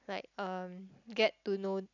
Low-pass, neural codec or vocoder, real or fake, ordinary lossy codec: 7.2 kHz; none; real; none